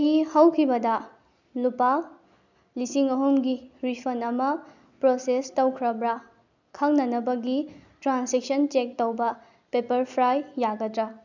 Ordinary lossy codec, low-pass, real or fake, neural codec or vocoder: none; 7.2 kHz; real; none